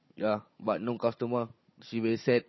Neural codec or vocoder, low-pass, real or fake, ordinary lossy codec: codec, 16 kHz, 16 kbps, FunCodec, trained on Chinese and English, 50 frames a second; 7.2 kHz; fake; MP3, 24 kbps